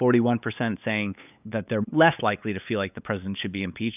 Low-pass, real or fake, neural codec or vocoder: 3.6 kHz; fake; codec, 16 kHz, 8 kbps, FunCodec, trained on LibriTTS, 25 frames a second